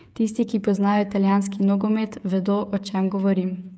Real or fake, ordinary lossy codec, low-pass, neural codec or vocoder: fake; none; none; codec, 16 kHz, 8 kbps, FreqCodec, smaller model